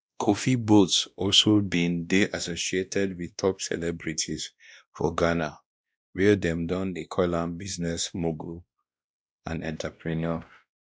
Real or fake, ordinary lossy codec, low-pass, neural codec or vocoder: fake; none; none; codec, 16 kHz, 1 kbps, X-Codec, WavLM features, trained on Multilingual LibriSpeech